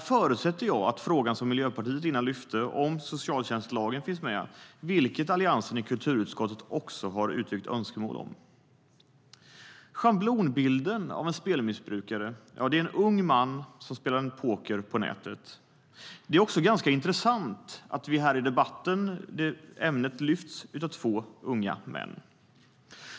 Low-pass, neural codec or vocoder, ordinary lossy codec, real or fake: none; none; none; real